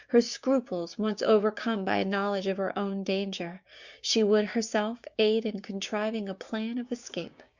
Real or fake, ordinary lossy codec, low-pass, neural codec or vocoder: fake; Opus, 64 kbps; 7.2 kHz; codec, 16 kHz, 6 kbps, DAC